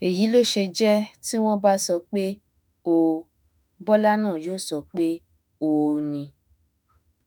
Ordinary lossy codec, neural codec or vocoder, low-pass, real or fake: none; autoencoder, 48 kHz, 32 numbers a frame, DAC-VAE, trained on Japanese speech; none; fake